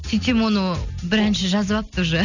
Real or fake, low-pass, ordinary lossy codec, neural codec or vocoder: real; 7.2 kHz; none; none